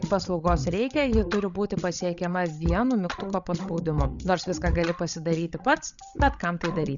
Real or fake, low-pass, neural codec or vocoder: fake; 7.2 kHz; codec, 16 kHz, 16 kbps, FreqCodec, larger model